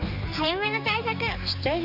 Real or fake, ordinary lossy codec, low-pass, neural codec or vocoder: fake; none; 5.4 kHz; codec, 16 kHz, 4 kbps, X-Codec, HuBERT features, trained on general audio